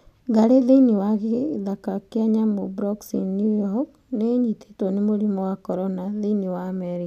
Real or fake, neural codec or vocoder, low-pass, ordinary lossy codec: real; none; 14.4 kHz; none